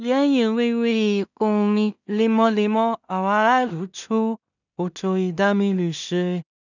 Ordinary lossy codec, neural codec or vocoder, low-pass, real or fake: none; codec, 16 kHz in and 24 kHz out, 0.4 kbps, LongCat-Audio-Codec, two codebook decoder; 7.2 kHz; fake